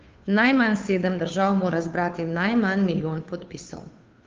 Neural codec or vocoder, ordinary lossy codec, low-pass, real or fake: codec, 16 kHz, 2 kbps, FunCodec, trained on Chinese and English, 25 frames a second; Opus, 32 kbps; 7.2 kHz; fake